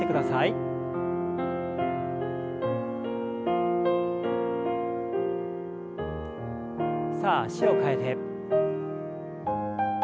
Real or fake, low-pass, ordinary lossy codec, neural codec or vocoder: real; none; none; none